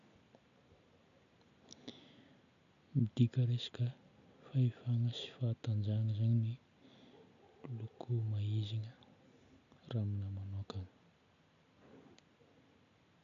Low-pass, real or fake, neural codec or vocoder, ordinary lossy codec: 7.2 kHz; real; none; none